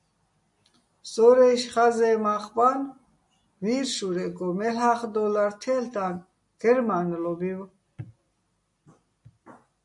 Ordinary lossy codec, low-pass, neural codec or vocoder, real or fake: MP3, 64 kbps; 10.8 kHz; none; real